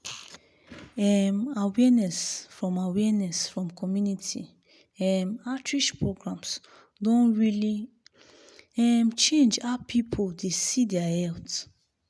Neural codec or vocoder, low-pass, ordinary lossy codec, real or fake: none; none; none; real